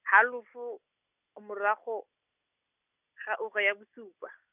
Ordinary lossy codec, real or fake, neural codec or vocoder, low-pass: none; real; none; 3.6 kHz